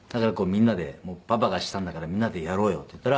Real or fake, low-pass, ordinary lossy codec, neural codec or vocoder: real; none; none; none